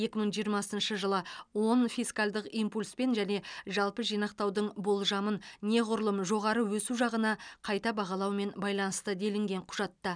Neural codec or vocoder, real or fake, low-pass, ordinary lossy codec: none; real; 9.9 kHz; none